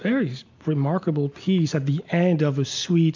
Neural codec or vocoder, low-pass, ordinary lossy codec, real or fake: none; 7.2 kHz; MP3, 64 kbps; real